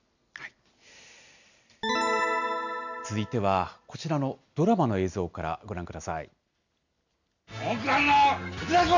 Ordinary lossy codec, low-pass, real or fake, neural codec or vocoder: none; 7.2 kHz; real; none